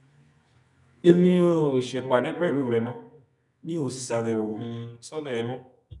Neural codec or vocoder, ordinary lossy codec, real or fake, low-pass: codec, 24 kHz, 0.9 kbps, WavTokenizer, medium music audio release; none; fake; 10.8 kHz